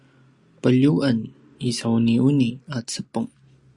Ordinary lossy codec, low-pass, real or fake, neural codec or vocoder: Opus, 64 kbps; 10.8 kHz; real; none